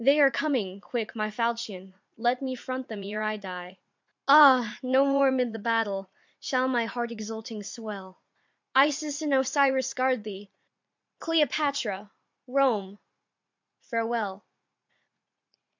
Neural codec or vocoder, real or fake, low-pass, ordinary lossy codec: vocoder, 44.1 kHz, 80 mel bands, Vocos; fake; 7.2 kHz; MP3, 64 kbps